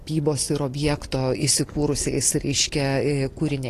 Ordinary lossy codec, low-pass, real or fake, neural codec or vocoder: AAC, 48 kbps; 14.4 kHz; real; none